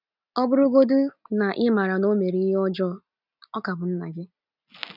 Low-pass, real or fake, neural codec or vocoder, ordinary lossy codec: 5.4 kHz; real; none; none